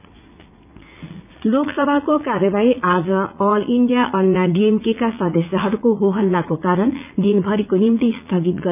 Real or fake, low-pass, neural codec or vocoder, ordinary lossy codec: fake; 3.6 kHz; vocoder, 44.1 kHz, 80 mel bands, Vocos; none